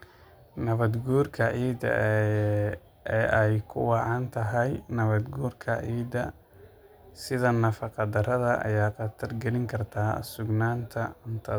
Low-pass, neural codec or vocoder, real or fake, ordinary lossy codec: none; none; real; none